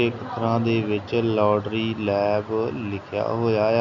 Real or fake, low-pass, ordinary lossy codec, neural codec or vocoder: real; 7.2 kHz; none; none